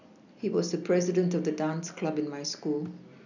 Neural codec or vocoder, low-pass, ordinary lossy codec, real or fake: none; 7.2 kHz; none; real